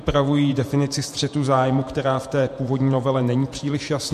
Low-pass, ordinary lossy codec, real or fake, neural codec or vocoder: 14.4 kHz; MP3, 64 kbps; fake; vocoder, 48 kHz, 128 mel bands, Vocos